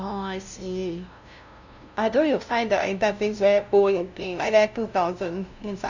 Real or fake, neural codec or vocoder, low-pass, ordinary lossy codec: fake; codec, 16 kHz, 0.5 kbps, FunCodec, trained on LibriTTS, 25 frames a second; 7.2 kHz; none